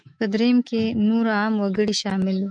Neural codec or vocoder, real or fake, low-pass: autoencoder, 48 kHz, 128 numbers a frame, DAC-VAE, trained on Japanese speech; fake; 9.9 kHz